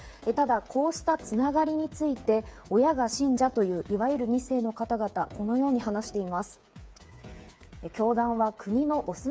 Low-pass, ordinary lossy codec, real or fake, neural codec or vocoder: none; none; fake; codec, 16 kHz, 8 kbps, FreqCodec, smaller model